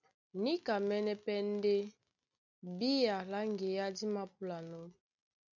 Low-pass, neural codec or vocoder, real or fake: 7.2 kHz; none; real